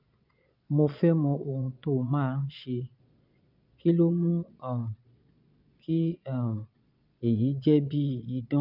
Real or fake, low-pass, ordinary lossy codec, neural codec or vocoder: fake; 5.4 kHz; none; vocoder, 22.05 kHz, 80 mel bands, Vocos